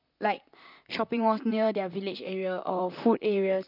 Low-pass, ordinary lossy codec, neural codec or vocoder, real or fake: 5.4 kHz; none; vocoder, 44.1 kHz, 128 mel bands, Pupu-Vocoder; fake